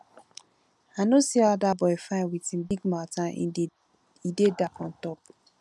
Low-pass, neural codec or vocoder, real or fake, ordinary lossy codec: none; none; real; none